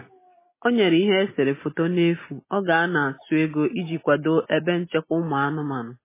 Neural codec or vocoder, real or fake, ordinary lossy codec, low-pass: none; real; MP3, 16 kbps; 3.6 kHz